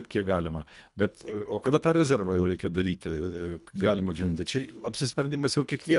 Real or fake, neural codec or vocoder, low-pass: fake; codec, 24 kHz, 1.5 kbps, HILCodec; 10.8 kHz